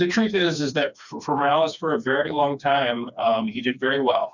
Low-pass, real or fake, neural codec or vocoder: 7.2 kHz; fake; codec, 16 kHz, 2 kbps, FreqCodec, smaller model